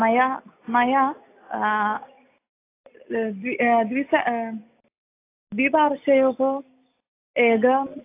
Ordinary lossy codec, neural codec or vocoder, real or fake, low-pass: AAC, 24 kbps; none; real; 3.6 kHz